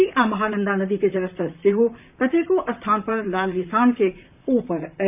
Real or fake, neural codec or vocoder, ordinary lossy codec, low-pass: fake; vocoder, 44.1 kHz, 128 mel bands, Pupu-Vocoder; none; 3.6 kHz